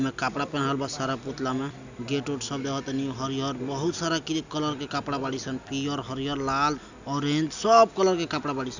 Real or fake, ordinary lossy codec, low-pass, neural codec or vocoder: real; none; 7.2 kHz; none